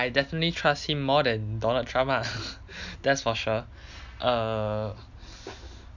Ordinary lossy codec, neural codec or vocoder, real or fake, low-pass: none; none; real; 7.2 kHz